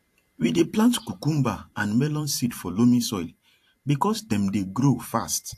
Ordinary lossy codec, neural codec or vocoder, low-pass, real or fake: AAC, 64 kbps; vocoder, 44.1 kHz, 128 mel bands every 512 samples, BigVGAN v2; 14.4 kHz; fake